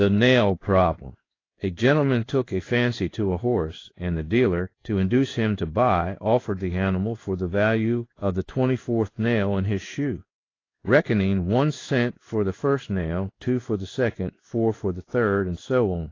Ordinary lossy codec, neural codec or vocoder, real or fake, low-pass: AAC, 32 kbps; codec, 16 kHz in and 24 kHz out, 1 kbps, XY-Tokenizer; fake; 7.2 kHz